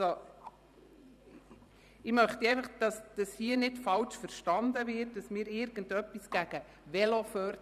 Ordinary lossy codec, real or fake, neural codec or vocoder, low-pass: none; fake; vocoder, 44.1 kHz, 128 mel bands every 512 samples, BigVGAN v2; 14.4 kHz